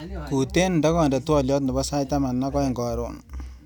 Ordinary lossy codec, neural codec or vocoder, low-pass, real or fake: none; none; none; real